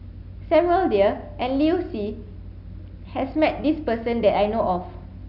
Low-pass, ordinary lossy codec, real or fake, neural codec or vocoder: 5.4 kHz; none; real; none